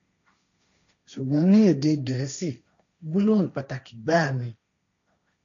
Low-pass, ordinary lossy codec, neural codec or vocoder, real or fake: 7.2 kHz; none; codec, 16 kHz, 1.1 kbps, Voila-Tokenizer; fake